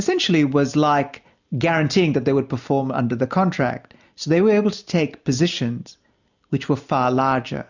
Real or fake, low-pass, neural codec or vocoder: real; 7.2 kHz; none